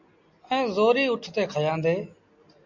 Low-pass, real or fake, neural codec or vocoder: 7.2 kHz; real; none